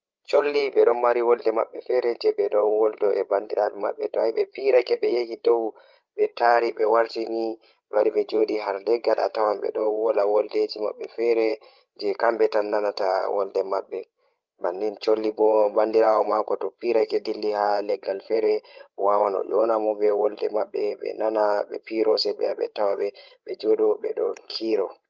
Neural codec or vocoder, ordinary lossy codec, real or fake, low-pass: codec, 16 kHz, 16 kbps, FreqCodec, larger model; Opus, 24 kbps; fake; 7.2 kHz